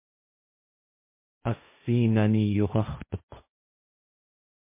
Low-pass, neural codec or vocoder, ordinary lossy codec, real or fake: 3.6 kHz; codec, 16 kHz, 1.1 kbps, Voila-Tokenizer; MP3, 24 kbps; fake